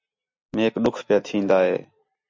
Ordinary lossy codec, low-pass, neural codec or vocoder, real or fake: MP3, 64 kbps; 7.2 kHz; none; real